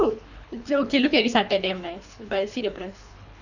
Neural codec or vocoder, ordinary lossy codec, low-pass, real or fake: codec, 24 kHz, 3 kbps, HILCodec; none; 7.2 kHz; fake